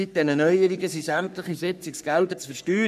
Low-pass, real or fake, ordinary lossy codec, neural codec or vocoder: 14.4 kHz; fake; none; codec, 44.1 kHz, 3.4 kbps, Pupu-Codec